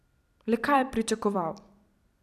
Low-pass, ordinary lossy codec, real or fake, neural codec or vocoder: 14.4 kHz; none; fake; vocoder, 44.1 kHz, 128 mel bands every 256 samples, BigVGAN v2